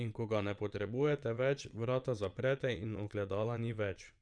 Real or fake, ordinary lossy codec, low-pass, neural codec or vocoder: fake; none; 9.9 kHz; vocoder, 22.05 kHz, 80 mel bands, WaveNeXt